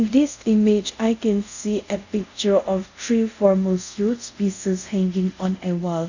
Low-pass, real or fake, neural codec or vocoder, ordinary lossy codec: 7.2 kHz; fake; codec, 24 kHz, 0.5 kbps, DualCodec; none